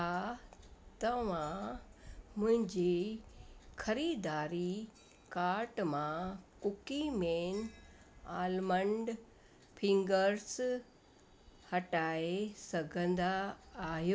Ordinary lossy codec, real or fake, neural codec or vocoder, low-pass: none; real; none; none